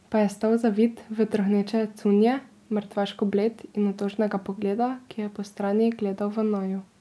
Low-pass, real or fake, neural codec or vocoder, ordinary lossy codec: none; real; none; none